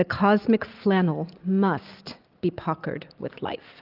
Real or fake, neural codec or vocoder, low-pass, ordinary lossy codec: real; none; 5.4 kHz; Opus, 32 kbps